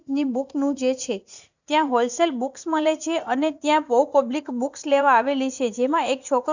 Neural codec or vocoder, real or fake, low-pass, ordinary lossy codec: vocoder, 44.1 kHz, 80 mel bands, Vocos; fake; 7.2 kHz; none